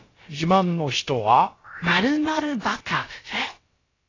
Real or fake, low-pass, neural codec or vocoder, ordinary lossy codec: fake; 7.2 kHz; codec, 16 kHz, about 1 kbps, DyCAST, with the encoder's durations; AAC, 32 kbps